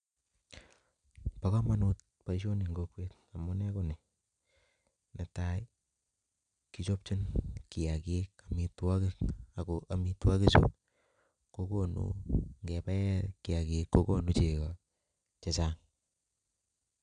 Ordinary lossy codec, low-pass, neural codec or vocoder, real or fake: none; 9.9 kHz; none; real